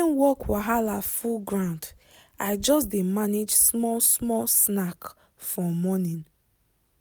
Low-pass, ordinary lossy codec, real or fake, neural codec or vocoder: none; none; real; none